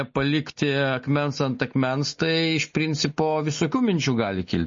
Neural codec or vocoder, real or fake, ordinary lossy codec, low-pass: none; real; MP3, 32 kbps; 7.2 kHz